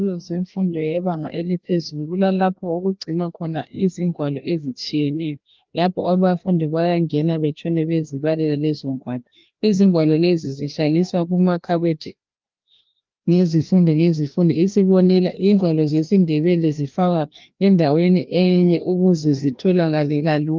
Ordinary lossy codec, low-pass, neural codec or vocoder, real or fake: Opus, 32 kbps; 7.2 kHz; codec, 16 kHz, 1 kbps, FreqCodec, larger model; fake